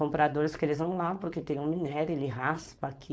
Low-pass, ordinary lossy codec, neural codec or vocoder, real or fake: none; none; codec, 16 kHz, 4.8 kbps, FACodec; fake